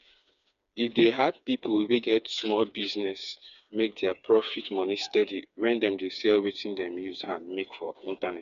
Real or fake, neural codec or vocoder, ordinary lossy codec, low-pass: fake; codec, 16 kHz, 4 kbps, FreqCodec, smaller model; none; 7.2 kHz